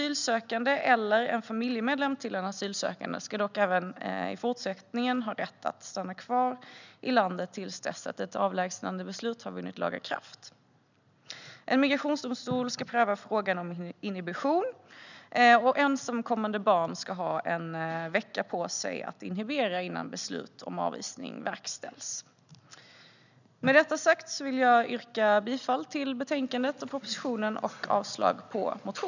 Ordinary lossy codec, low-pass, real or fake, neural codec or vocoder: none; 7.2 kHz; real; none